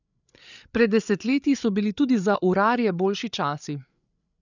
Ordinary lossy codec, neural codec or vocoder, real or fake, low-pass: none; codec, 16 kHz, 8 kbps, FreqCodec, larger model; fake; 7.2 kHz